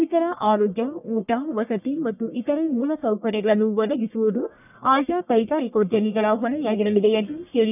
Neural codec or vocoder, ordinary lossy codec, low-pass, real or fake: codec, 44.1 kHz, 1.7 kbps, Pupu-Codec; none; 3.6 kHz; fake